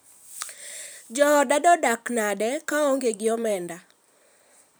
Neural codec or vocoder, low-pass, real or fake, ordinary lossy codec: vocoder, 44.1 kHz, 128 mel bands every 512 samples, BigVGAN v2; none; fake; none